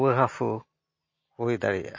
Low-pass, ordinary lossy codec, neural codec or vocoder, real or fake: 7.2 kHz; MP3, 32 kbps; none; real